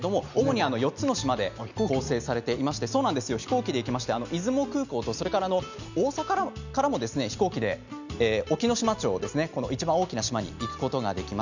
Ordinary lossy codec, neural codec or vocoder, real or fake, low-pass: none; none; real; 7.2 kHz